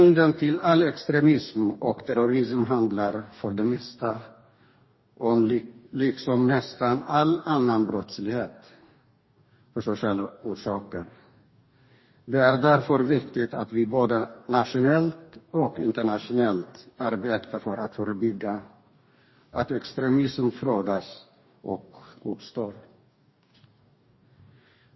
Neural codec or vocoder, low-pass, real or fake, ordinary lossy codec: codec, 44.1 kHz, 2.6 kbps, DAC; 7.2 kHz; fake; MP3, 24 kbps